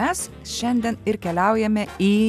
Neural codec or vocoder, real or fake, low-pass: none; real; 14.4 kHz